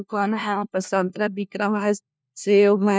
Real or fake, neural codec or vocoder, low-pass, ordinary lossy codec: fake; codec, 16 kHz, 1 kbps, FunCodec, trained on LibriTTS, 50 frames a second; none; none